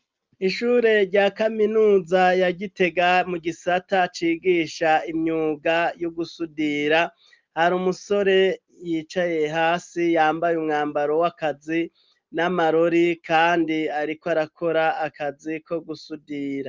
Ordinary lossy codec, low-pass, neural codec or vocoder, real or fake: Opus, 24 kbps; 7.2 kHz; none; real